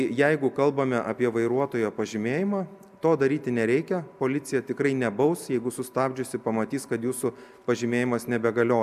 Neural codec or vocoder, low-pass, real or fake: none; 14.4 kHz; real